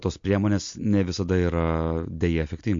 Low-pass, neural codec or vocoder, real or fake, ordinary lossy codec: 7.2 kHz; none; real; MP3, 48 kbps